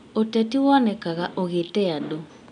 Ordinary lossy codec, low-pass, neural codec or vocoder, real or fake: none; 9.9 kHz; none; real